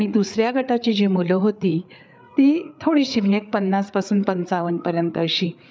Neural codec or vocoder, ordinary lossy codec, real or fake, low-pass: codec, 16 kHz, 8 kbps, FreqCodec, larger model; none; fake; none